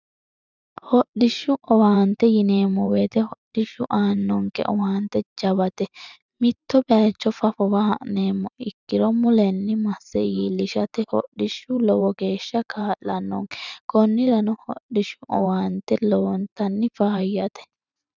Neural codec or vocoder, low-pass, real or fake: vocoder, 44.1 kHz, 128 mel bands every 512 samples, BigVGAN v2; 7.2 kHz; fake